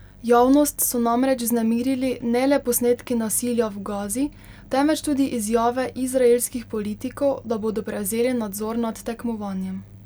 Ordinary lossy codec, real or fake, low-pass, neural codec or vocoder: none; real; none; none